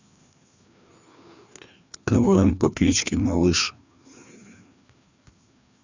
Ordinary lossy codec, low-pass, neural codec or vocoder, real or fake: Opus, 64 kbps; 7.2 kHz; codec, 16 kHz, 2 kbps, FreqCodec, larger model; fake